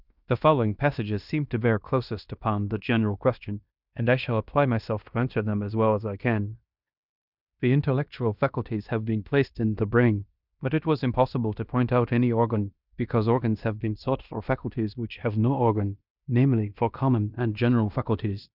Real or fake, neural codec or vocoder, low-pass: fake; codec, 16 kHz in and 24 kHz out, 0.9 kbps, LongCat-Audio-Codec, fine tuned four codebook decoder; 5.4 kHz